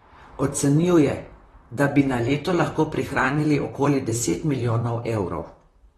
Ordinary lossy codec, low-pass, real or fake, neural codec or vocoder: AAC, 32 kbps; 19.8 kHz; fake; vocoder, 44.1 kHz, 128 mel bands, Pupu-Vocoder